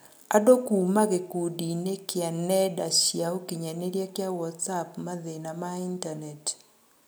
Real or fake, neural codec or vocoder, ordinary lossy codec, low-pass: real; none; none; none